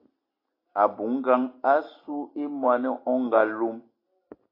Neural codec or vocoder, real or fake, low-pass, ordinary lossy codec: none; real; 5.4 kHz; AAC, 24 kbps